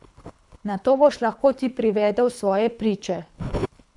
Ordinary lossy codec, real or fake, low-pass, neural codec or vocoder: none; fake; 10.8 kHz; codec, 24 kHz, 3 kbps, HILCodec